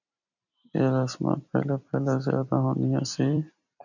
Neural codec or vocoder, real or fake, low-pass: vocoder, 44.1 kHz, 128 mel bands every 256 samples, BigVGAN v2; fake; 7.2 kHz